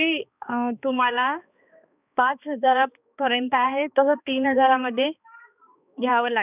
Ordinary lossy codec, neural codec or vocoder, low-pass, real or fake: none; codec, 16 kHz, 2 kbps, X-Codec, HuBERT features, trained on balanced general audio; 3.6 kHz; fake